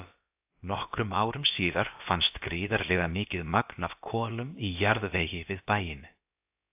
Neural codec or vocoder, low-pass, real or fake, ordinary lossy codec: codec, 16 kHz, about 1 kbps, DyCAST, with the encoder's durations; 3.6 kHz; fake; AAC, 32 kbps